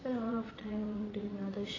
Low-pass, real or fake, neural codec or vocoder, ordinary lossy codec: 7.2 kHz; fake; vocoder, 22.05 kHz, 80 mel bands, Vocos; AAC, 32 kbps